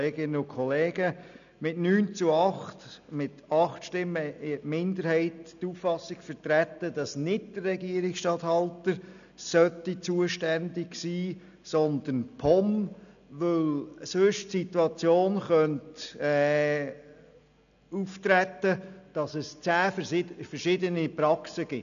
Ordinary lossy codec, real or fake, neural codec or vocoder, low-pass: none; real; none; 7.2 kHz